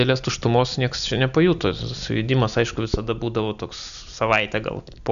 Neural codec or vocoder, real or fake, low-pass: none; real; 7.2 kHz